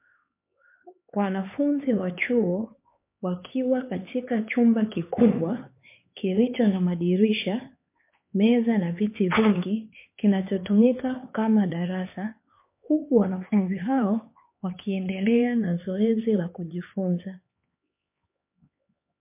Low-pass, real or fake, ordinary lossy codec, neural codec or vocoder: 3.6 kHz; fake; MP3, 24 kbps; codec, 16 kHz, 4 kbps, X-Codec, HuBERT features, trained on LibriSpeech